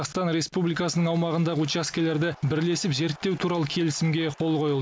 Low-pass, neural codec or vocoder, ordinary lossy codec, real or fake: none; none; none; real